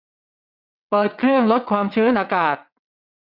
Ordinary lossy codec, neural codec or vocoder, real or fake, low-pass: none; vocoder, 22.05 kHz, 80 mel bands, WaveNeXt; fake; 5.4 kHz